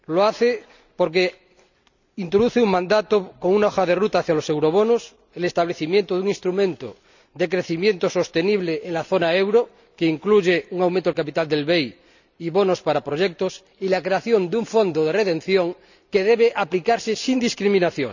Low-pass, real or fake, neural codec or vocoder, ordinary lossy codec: 7.2 kHz; real; none; none